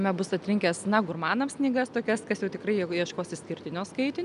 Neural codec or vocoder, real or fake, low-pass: none; real; 10.8 kHz